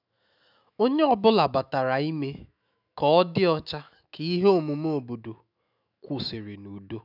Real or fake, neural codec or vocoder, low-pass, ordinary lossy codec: fake; autoencoder, 48 kHz, 128 numbers a frame, DAC-VAE, trained on Japanese speech; 5.4 kHz; none